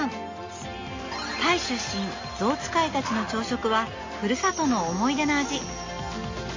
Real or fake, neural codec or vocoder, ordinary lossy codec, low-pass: real; none; MP3, 32 kbps; 7.2 kHz